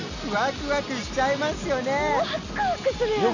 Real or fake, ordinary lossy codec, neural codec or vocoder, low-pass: real; none; none; 7.2 kHz